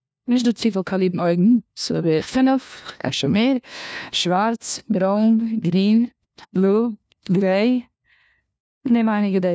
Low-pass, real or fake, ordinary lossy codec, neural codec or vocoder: none; fake; none; codec, 16 kHz, 1 kbps, FunCodec, trained on LibriTTS, 50 frames a second